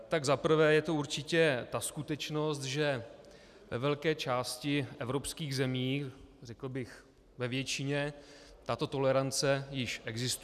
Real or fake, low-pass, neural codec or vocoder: real; 14.4 kHz; none